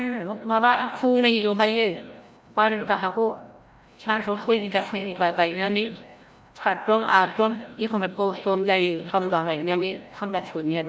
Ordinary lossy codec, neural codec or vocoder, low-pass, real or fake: none; codec, 16 kHz, 0.5 kbps, FreqCodec, larger model; none; fake